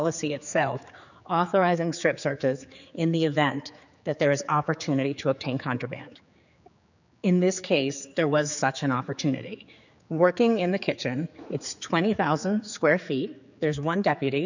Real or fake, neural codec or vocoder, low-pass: fake; codec, 16 kHz, 4 kbps, X-Codec, HuBERT features, trained on general audio; 7.2 kHz